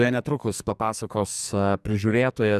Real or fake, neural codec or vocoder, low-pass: fake; codec, 44.1 kHz, 2.6 kbps, SNAC; 14.4 kHz